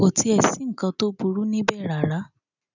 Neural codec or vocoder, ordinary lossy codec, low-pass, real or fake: none; none; 7.2 kHz; real